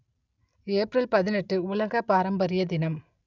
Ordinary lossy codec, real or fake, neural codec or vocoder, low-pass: none; real; none; 7.2 kHz